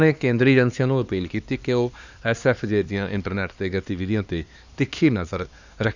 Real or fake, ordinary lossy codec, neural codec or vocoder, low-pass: fake; Opus, 64 kbps; codec, 16 kHz, 2 kbps, X-Codec, HuBERT features, trained on LibriSpeech; 7.2 kHz